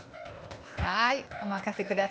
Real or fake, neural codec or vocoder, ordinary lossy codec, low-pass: fake; codec, 16 kHz, 0.8 kbps, ZipCodec; none; none